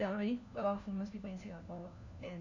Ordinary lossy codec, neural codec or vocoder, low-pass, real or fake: none; codec, 16 kHz, 1 kbps, FunCodec, trained on LibriTTS, 50 frames a second; 7.2 kHz; fake